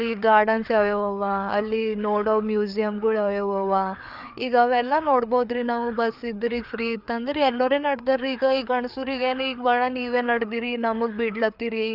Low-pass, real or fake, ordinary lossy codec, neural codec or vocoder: 5.4 kHz; fake; none; codec, 16 kHz, 4 kbps, FreqCodec, larger model